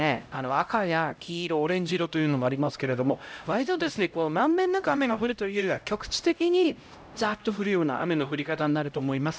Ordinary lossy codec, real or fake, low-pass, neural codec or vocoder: none; fake; none; codec, 16 kHz, 0.5 kbps, X-Codec, HuBERT features, trained on LibriSpeech